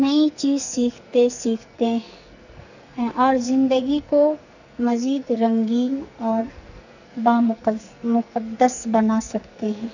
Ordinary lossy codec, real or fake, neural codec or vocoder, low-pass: none; fake; codec, 44.1 kHz, 2.6 kbps, SNAC; 7.2 kHz